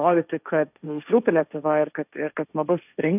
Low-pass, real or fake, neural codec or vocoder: 3.6 kHz; fake; codec, 16 kHz, 1.1 kbps, Voila-Tokenizer